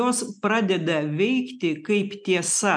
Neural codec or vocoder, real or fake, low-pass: none; real; 9.9 kHz